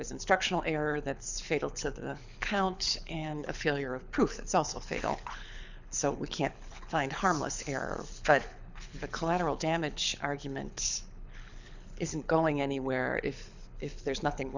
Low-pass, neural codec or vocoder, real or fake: 7.2 kHz; codec, 24 kHz, 6 kbps, HILCodec; fake